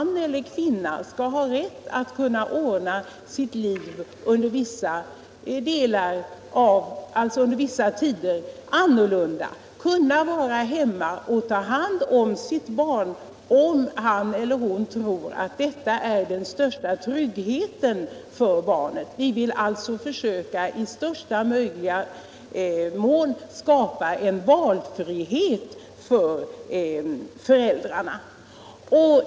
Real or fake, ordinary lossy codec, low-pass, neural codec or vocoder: real; none; none; none